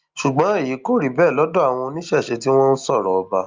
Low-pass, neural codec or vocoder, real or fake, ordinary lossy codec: 7.2 kHz; none; real; Opus, 24 kbps